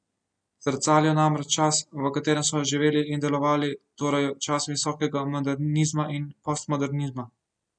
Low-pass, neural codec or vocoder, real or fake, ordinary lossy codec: 9.9 kHz; none; real; none